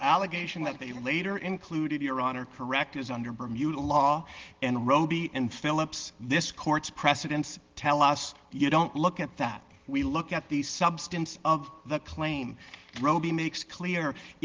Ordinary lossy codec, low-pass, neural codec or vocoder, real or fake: Opus, 16 kbps; 7.2 kHz; none; real